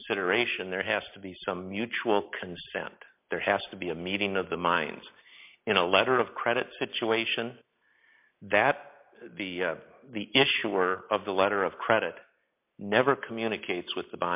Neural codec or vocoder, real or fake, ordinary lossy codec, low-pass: none; real; MP3, 32 kbps; 3.6 kHz